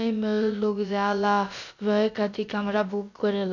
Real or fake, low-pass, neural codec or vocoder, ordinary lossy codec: fake; 7.2 kHz; codec, 16 kHz, about 1 kbps, DyCAST, with the encoder's durations; none